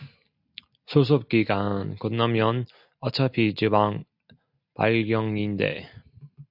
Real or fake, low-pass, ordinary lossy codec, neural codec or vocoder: real; 5.4 kHz; AAC, 48 kbps; none